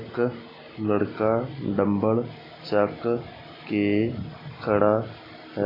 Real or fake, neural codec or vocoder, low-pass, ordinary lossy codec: real; none; 5.4 kHz; AAC, 24 kbps